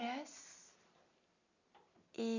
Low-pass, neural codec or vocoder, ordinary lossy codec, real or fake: 7.2 kHz; none; none; real